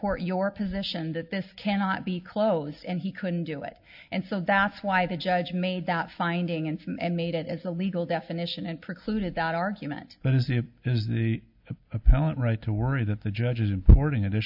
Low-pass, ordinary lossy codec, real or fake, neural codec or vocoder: 5.4 kHz; AAC, 48 kbps; real; none